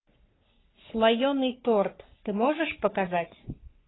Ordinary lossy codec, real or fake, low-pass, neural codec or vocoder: AAC, 16 kbps; fake; 7.2 kHz; codec, 44.1 kHz, 3.4 kbps, Pupu-Codec